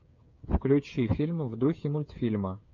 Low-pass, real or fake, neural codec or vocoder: 7.2 kHz; fake; codec, 16 kHz, 8 kbps, FreqCodec, smaller model